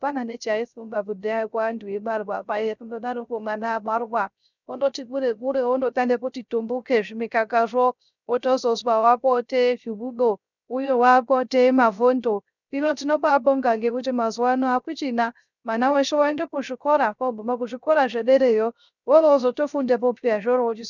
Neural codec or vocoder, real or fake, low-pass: codec, 16 kHz, 0.3 kbps, FocalCodec; fake; 7.2 kHz